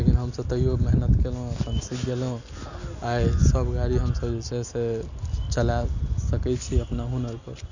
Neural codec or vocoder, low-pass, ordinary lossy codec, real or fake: none; 7.2 kHz; none; real